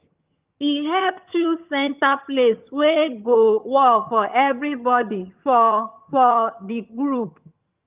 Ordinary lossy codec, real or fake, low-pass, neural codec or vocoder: Opus, 24 kbps; fake; 3.6 kHz; vocoder, 22.05 kHz, 80 mel bands, HiFi-GAN